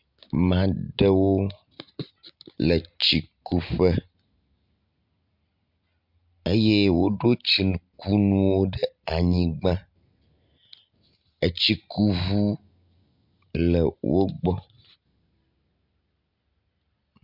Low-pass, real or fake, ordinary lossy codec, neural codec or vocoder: 5.4 kHz; real; MP3, 48 kbps; none